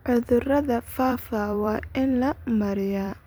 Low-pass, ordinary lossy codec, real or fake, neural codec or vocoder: none; none; fake; vocoder, 44.1 kHz, 128 mel bands every 256 samples, BigVGAN v2